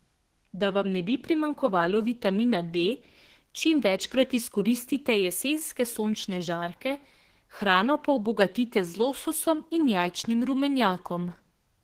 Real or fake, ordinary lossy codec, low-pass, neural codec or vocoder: fake; Opus, 16 kbps; 14.4 kHz; codec, 32 kHz, 1.9 kbps, SNAC